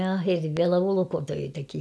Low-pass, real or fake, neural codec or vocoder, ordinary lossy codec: none; real; none; none